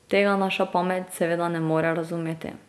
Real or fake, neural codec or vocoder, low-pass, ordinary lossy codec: real; none; none; none